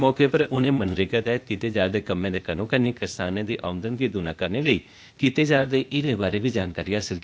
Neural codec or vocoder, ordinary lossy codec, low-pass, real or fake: codec, 16 kHz, 0.8 kbps, ZipCodec; none; none; fake